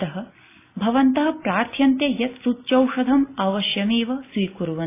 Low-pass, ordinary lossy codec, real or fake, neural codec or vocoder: 3.6 kHz; AAC, 24 kbps; real; none